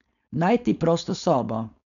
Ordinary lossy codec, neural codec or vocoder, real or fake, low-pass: none; codec, 16 kHz, 4.8 kbps, FACodec; fake; 7.2 kHz